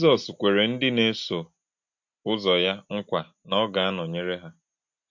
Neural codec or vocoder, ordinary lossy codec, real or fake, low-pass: none; MP3, 48 kbps; real; 7.2 kHz